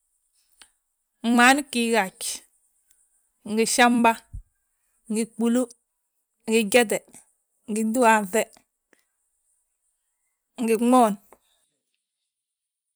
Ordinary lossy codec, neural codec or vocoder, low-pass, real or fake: none; vocoder, 44.1 kHz, 128 mel bands every 256 samples, BigVGAN v2; none; fake